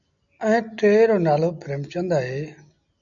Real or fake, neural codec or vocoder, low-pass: real; none; 7.2 kHz